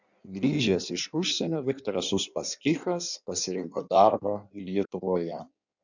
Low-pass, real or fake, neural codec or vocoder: 7.2 kHz; fake; codec, 16 kHz in and 24 kHz out, 1.1 kbps, FireRedTTS-2 codec